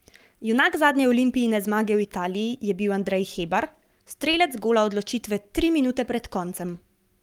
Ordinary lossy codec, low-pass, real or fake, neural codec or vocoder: Opus, 32 kbps; 19.8 kHz; fake; codec, 44.1 kHz, 7.8 kbps, Pupu-Codec